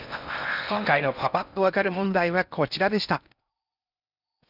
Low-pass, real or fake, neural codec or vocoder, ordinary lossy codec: 5.4 kHz; fake; codec, 16 kHz in and 24 kHz out, 0.6 kbps, FocalCodec, streaming, 4096 codes; none